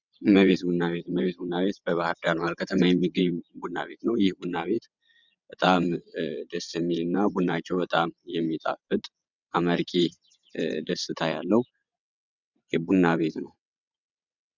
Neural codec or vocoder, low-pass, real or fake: vocoder, 22.05 kHz, 80 mel bands, WaveNeXt; 7.2 kHz; fake